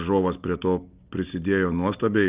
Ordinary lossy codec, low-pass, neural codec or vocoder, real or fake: Opus, 24 kbps; 3.6 kHz; none; real